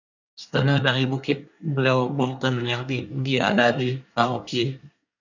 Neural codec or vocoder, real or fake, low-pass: codec, 24 kHz, 1 kbps, SNAC; fake; 7.2 kHz